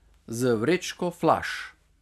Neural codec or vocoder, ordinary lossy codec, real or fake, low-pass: none; none; real; 14.4 kHz